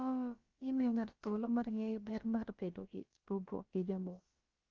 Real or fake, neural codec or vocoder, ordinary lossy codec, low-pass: fake; codec, 16 kHz, about 1 kbps, DyCAST, with the encoder's durations; Opus, 32 kbps; 7.2 kHz